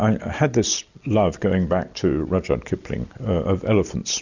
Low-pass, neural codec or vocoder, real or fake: 7.2 kHz; none; real